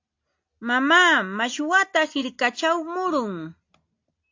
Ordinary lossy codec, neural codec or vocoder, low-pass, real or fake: AAC, 48 kbps; none; 7.2 kHz; real